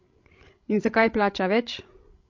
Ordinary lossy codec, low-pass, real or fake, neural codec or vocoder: MP3, 48 kbps; 7.2 kHz; fake; codec, 16 kHz, 16 kbps, FreqCodec, larger model